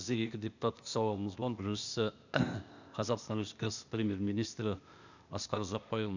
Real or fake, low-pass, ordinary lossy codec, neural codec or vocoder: fake; 7.2 kHz; none; codec, 16 kHz, 0.8 kbps, ZipCodec